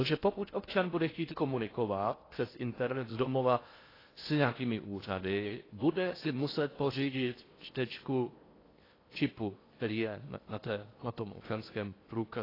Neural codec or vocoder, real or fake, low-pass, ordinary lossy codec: codec, 16 kHz in and 24 kHz out, 0.8 kbps, FocalCodec, streaming, 65536 codes; fake; 5.4 kHz; AAC, 24 kbps